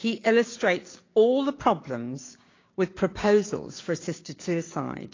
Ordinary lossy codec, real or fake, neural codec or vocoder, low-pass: AAC, 32 kbps; fake; codec, 16 kHz, 6 kbps, DAC; 7.2 kHz